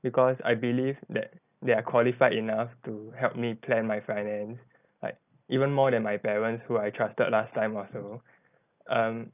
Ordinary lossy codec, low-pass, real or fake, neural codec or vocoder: none; 3.6 kHz; real; none